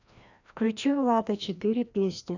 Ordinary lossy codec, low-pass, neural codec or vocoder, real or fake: none; 7.2 kHz; codec, 16 kHz, 1 kbps, FreqCodec, larger model; fake